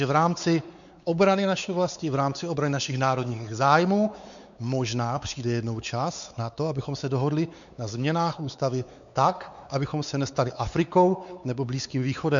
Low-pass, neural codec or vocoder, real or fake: 7.2 kHz; codec, 16 kHz, 4 kbps, X-Codec, WavLM features, trained on Multilingual LibriSpeech; fake